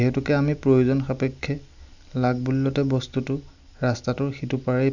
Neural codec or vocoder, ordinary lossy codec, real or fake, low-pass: none; none; real; 7.2 kHz